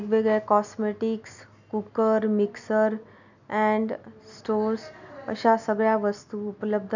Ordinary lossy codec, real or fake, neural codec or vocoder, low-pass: none; real; none; 7.2 kHz